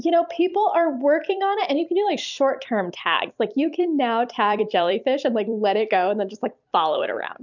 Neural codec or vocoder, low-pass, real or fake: vocoder, 44.1 kHz, 128 mel bands every 256 samples, BigVGAN v2; 7.2 kHz; fake